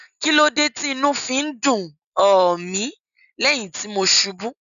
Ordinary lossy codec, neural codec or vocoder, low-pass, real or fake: none; none; 7.2 kHz; real